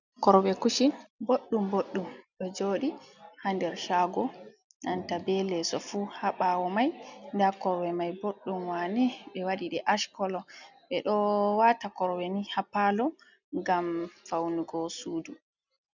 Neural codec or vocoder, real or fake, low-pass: none; real; 7.2 kHz